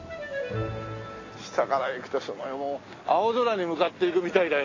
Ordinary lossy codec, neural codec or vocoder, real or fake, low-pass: AAC, 32 kbps; none; real; 7.2 kHz